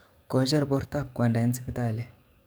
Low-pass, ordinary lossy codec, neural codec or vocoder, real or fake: none; none; codec, 44.1 kHz, 7.8 kbps, DAC; fake